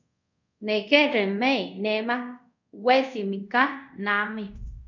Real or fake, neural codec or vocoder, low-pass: fake; codec, 24 kHz, 0.5 kbps, DualCodec; 7.2 kHz